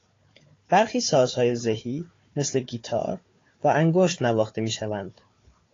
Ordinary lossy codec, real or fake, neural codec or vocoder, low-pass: AAC, 32 kbps; fake; codec, 16 kHz, 4 kbps, FunCodec, trained on Chinese and English, 50 frames a second; 7.2 kHz